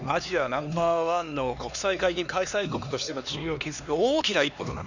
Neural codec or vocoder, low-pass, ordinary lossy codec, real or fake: codec, 16 kHz, 2 kbps, X-Codec, HuBERT features, trained on LibriSpeech; 7.2 kHz; none; fake